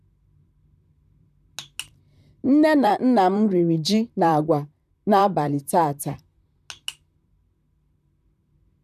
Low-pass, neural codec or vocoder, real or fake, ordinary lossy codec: 14.4 kHz; vocoder, 44.1 kHz, 128 mel bands, Pupu-Vocoder; fake; none